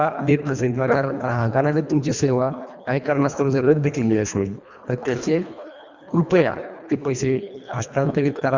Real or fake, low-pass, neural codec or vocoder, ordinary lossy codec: fake; 7.2 kHz; codec, 24 kHz, 1.5 kbps, HILCodec; Opus, 64 kbps